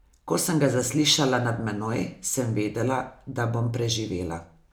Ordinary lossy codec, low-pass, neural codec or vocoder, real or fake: none; none; none; real